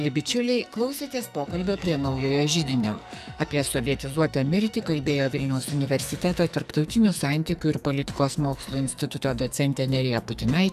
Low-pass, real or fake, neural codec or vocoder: 14.4 kHz; fake; codec, 44.1 kHz, 2.6 kbps, SNAC